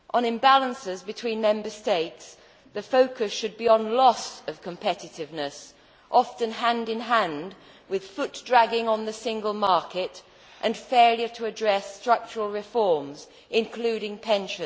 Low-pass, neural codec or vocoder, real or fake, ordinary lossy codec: none; none; real; none